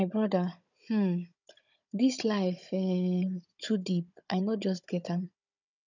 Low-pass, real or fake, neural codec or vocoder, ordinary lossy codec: 7.2 kHz; fake; codec, 16 kHz, 16 kbps, FunCodec, trained on Chinese and English, 50 frames a second; none